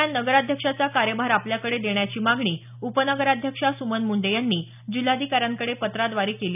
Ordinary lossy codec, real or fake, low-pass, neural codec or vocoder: none; real; 3.6 kHz; none